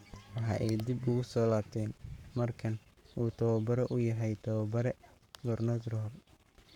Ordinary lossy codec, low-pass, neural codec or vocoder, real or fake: none; 19.8 kHz; codec, 44.1 kHz, 7.8 kbps, Pupu-Codec; fake